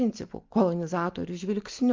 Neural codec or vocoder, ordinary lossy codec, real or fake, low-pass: none; Opus, 24 kbps; real; 7.2 kHz